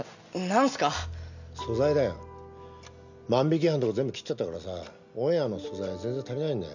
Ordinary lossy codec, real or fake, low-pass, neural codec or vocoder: none; real; 7.2 kHz; none